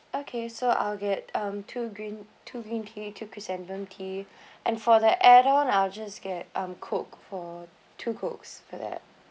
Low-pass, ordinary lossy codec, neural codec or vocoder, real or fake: none; none; none; real